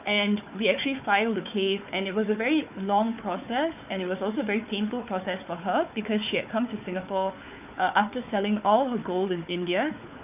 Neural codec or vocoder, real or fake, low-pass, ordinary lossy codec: codec, 16 kHz, 4 kbps, FunCodec, trained on Chinese and English, 50 frames a second; fake; 3.6 kHz; none